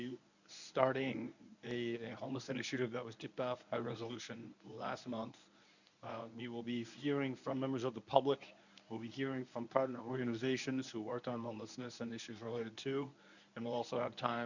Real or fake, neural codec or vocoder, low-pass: fake; codec, 24 kHz, 0.9 kbps, WavTokenizer, medium speech release version 1; 7.2 kHz